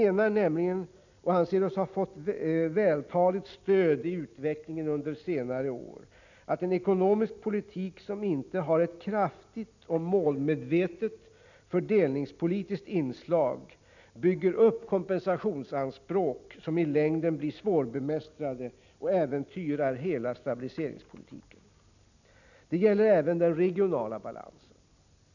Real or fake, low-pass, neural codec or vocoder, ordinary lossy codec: real; 7.2 kHz; none; none